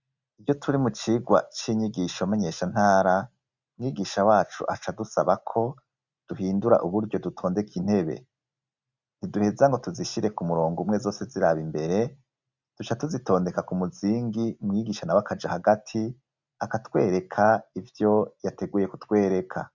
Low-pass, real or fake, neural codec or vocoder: 7.2 kHz; real; none